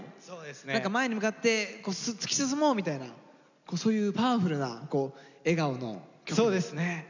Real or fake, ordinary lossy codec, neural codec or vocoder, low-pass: real; none; none; 7.2 kHz